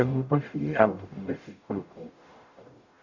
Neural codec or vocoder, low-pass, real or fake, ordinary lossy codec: codec, 44.1 kHz, 0.9 kbps, DAC; 7.2 kHz; fake; none